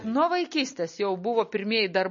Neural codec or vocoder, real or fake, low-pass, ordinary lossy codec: none; real; 7.2 kHz; MP3, 32 kbps